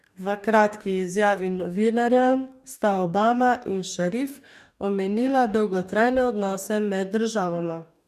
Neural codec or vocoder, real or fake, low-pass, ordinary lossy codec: codec, 44.1 kHz, 2.6 kbps, DAC; fake; 14.4 kHz; none